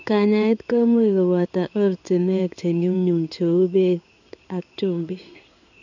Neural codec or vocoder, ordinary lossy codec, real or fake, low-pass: codec, 16 kHz in and 24 kHz out, 1 kbps, XY-Tokenizer; none; fake; 7.2 kHz